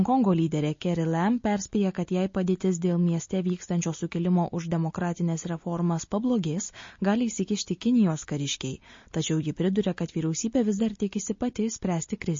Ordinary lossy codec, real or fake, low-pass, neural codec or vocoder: MP3, 32 kbps; real; 7.2 kHz; none